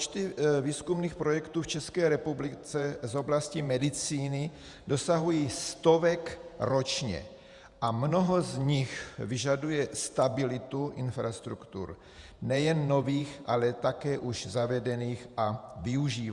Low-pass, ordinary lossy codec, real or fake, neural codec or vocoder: 10.8 kHz; Opus, 64 kbps; fake; vocoder, 48 kHz, 128 mel bands, Vocos